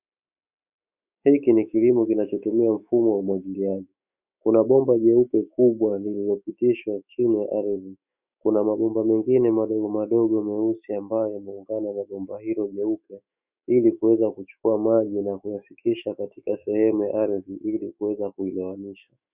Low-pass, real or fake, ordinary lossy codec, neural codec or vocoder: 3.6 kHz; fake; Opus, 64 kbps; autoencoder, 48 kHz, 128 numbers a frame, DAC-VAE, trained on Japanese speech